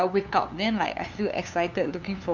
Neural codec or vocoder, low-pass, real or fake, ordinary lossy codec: codec, 16 kHz, 2 kbps, FunCodec, trained on LibriTTS, 25 frames a second; 7.2 kHz; fake; none